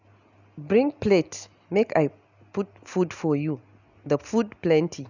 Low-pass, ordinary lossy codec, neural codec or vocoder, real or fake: 7.2 kHz; none; none; real